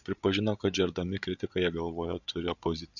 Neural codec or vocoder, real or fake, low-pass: codec, 16 kHz, 16 kbps, FreqCodec, larger model; fake; 7.2 kHz